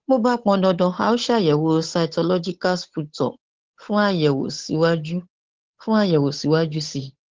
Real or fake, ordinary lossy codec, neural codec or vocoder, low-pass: fake; Opus, 16 kbps; codec, 16 kHz, 8 kbps, FunCodec, trained on Chinese and English, 25 frames a second; 7.2 kHz